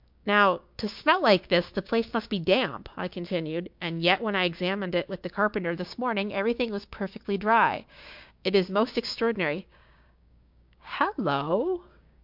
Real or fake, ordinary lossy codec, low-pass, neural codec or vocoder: fake; MP3, 48 kbps; 5.4 kHz; codec, 16 kHz, 6 kbps, DAC